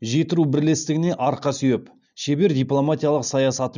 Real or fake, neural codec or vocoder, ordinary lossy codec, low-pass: real; none; none; 7.2 kHz